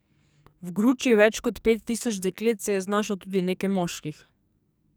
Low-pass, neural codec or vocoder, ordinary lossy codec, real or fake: none; codec, 44.1 kHz, 2.6 kbps, SNAC; none; fake